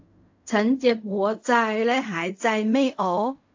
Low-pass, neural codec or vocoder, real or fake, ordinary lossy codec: 7.2 kHz; codec, 16 kHz in and 24 kHz out, 0.4 kbps, LongCat-Audio-Codec, fine tuned four codebook decoder; fake; AAC, 48 kbps